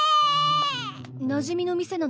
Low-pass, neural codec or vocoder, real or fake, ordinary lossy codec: none; none; real; none